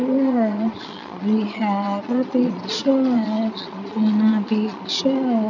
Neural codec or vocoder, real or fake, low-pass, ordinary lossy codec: vocoder, 22.05 kHz, 80 mel bands, Vocos; fake; 7.2 kHz; none